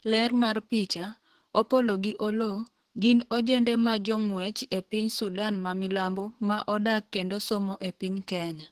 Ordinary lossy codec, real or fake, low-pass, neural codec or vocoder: Opus, 16 kbps; fake; 14.4 kHz; codec, 44.1 kHz, 2.6 kbps, SNAC